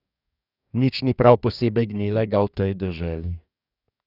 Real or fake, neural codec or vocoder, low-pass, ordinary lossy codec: fake; codec, 44.1 kHz, 2.6 kbps, DAC; 5.4 kHz; none